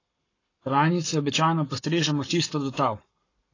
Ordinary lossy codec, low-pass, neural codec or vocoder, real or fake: AAC, 32 kbps; 7.2 kHz; codec, 44.1 kHz, 7.8 kbps, Pupu-Codec; fake